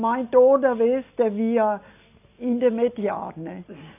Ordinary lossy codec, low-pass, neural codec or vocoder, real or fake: none; 3.6 kHz; none; real